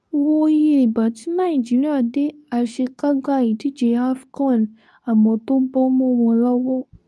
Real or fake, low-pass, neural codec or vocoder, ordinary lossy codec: fake; none; codec, 24 kHz, 0.9 kbps, WavTokenizer, medium speech release version 2; none